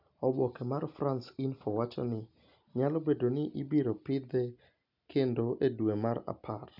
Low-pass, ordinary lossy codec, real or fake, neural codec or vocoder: 5.4 kHz; none; real; none